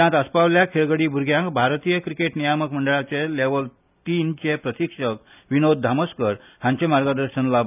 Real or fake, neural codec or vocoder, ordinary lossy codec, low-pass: real; none; none; 3.6 kHz